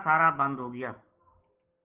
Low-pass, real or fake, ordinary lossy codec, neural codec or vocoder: 3.6 kHz; real; Opus, 16 kbps; none